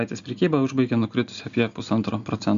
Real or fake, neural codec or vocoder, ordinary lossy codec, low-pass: real; none; AAC, 96 kbps; 7.2 kHz